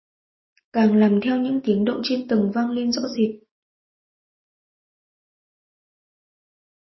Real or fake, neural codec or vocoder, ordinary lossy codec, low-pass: real; none; MP3, 24 kbps; 7.2 kHz